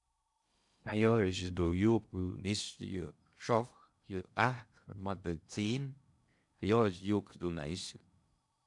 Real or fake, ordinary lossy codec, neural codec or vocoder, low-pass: fake; none; codec, 16 kHz in and 24 kHz out, 0.6 kbps, FocalCodec, streaming, 2048 codes; 10.8 kHz